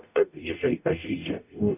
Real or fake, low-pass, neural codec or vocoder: fake; 3.6 kHz; codec, 44.1 kHz, 0.9 kbps, DAC